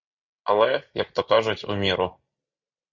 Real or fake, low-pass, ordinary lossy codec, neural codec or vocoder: real; 7.2 kHz; Opus, 64 kbps; none